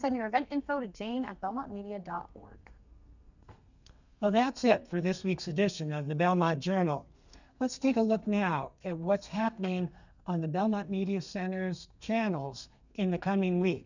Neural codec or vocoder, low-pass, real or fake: codec, 32 kHz, 1.9 kbps, SNAC; 7.2 kHz; fake